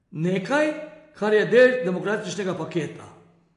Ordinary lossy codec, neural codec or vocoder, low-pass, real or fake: AAC, 48 kbps; none; 10.8 kHz; real